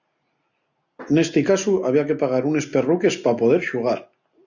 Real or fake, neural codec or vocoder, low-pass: real; none; 7.2 kHz